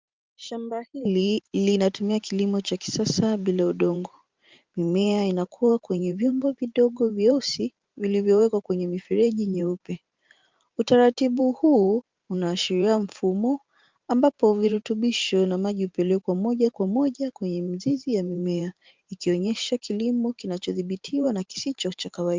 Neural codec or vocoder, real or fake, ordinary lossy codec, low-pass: vocoder, 44.1 kHz, 128 mel bands every 512 samples, BigVGAN v2; fake; Opus, 32 kbps; 7.2 kHz